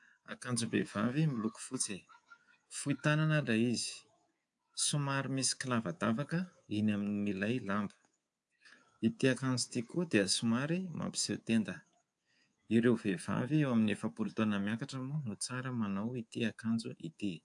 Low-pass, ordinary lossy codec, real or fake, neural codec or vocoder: 10.8 kHz; AAC, 64 kbps; fake; codec, 24 kHz, 3.1 kbps, DualCodec